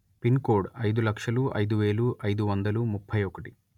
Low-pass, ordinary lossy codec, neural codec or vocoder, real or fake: 19.8 kHz; none; none; real